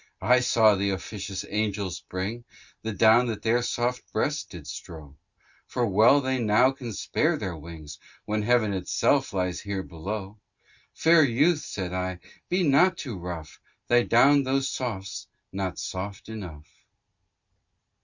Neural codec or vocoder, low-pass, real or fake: none; 7.2 kHz; real